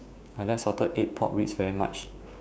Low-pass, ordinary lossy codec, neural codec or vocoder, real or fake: none; none; codec, 16 kHz, 6 kbps, DAC; fake